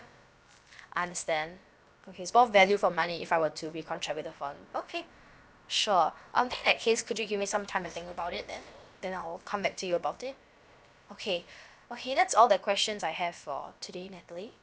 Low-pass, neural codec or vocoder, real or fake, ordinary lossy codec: none; codec, 16 kHz, about 1 kbps, DyCAST, with the encoder's durations; fake; none